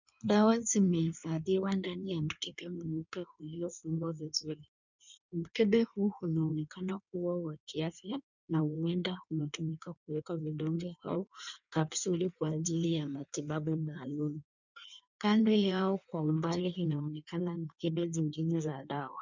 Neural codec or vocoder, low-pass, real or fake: codec, 16 kHz in and 24 kHz out, 1.1 kbps, FireRedTTS-2 codec; 7.2 kHz; fake